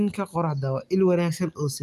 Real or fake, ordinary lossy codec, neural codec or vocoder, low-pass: real; Opus, 32 kbps; none; 14.4 kHz